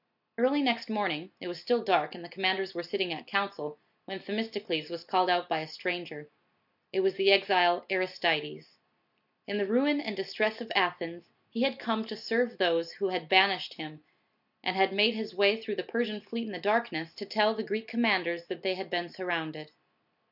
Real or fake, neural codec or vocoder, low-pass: real; none; 5.4 kHz